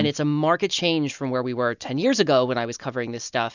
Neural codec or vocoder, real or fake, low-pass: none; real; 7.2 kHz